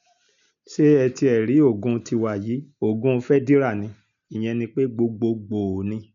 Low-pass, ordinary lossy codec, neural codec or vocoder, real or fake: 7.2 kHz; none; none; real